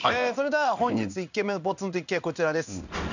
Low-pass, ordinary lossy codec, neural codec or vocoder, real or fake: 7.2 kHz; none; codec, 16 kHz in and 24 kHz out, 1 kbps, XY-Tokenizer; fake